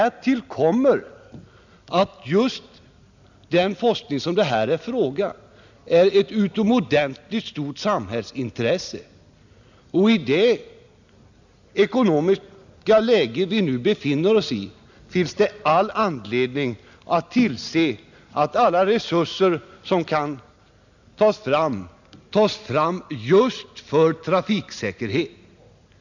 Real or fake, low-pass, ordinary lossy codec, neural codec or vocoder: real; 7.2 kHz; none; none